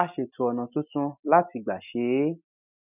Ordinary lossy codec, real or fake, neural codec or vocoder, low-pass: none; real; none; 3.6 kHz